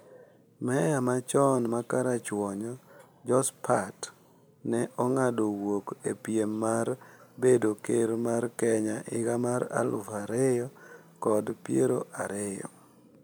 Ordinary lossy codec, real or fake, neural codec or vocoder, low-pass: none; real; none; none